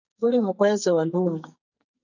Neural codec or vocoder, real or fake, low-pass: codec, 44.1 kHz, 2.6 kbps, SNAC; fake; 7.2 kHz